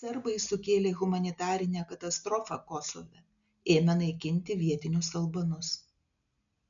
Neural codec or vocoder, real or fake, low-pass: none; real; 7.2 kHz